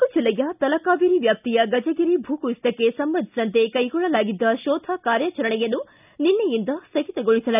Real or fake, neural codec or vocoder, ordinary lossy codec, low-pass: real; none; none; 3.6 kHz